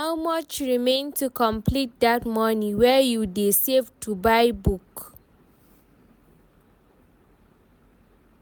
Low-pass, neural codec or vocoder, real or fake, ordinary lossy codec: none; none; real; none